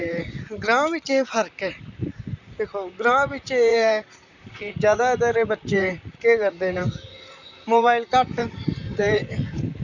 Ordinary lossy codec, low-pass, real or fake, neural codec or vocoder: none; 7.2 kHz; fake; vocoder, 44.1 kHz, 128 mel bands, Pupu-Vocoder